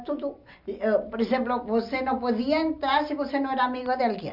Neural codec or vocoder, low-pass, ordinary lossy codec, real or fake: none; 5.4 kHz; none; real